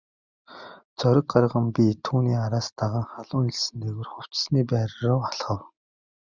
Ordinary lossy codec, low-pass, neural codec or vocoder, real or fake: Opus, 64 kbps; 7.2 kHz; none; real